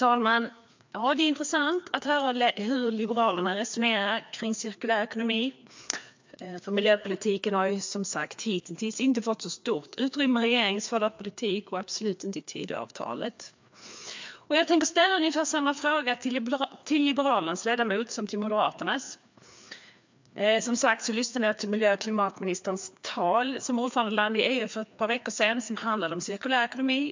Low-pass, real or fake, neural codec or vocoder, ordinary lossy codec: 7.2 kHz; fake; codec, 16 kHz, 2 kbps, FreqCodec, larger model; MP3, 64 kbps